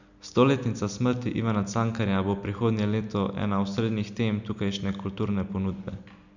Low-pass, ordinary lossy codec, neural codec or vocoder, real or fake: 7.2 kHz; none; none; real